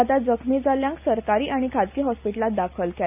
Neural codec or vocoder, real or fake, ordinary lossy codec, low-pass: none; real; none; 3.6 kHz